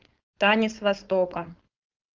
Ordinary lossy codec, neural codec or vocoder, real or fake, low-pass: Opus, 32 kbps; codec, 16 kHz, 4.8 kbps, FACodec; fake; 7.2 kHz